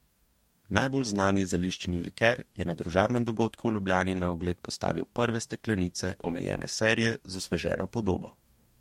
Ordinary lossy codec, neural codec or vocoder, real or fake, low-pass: MP3, 64 kbps; codec, 44.1 kHz, 2.6 kbps, DAC; fake; 19.8 kHz